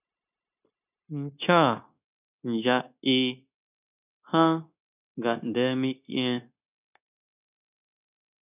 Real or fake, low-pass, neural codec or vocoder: fake; 3.6 kHz; codec, 16 kHz, 0.9 kbps, LongCat-Audio-Codec